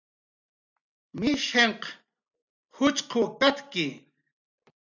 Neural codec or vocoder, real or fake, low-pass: none; real; 7.2 kHz